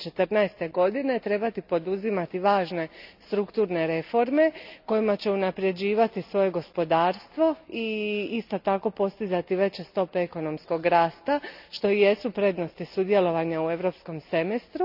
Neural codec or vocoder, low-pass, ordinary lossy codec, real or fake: none; 5.4 kHz; none; real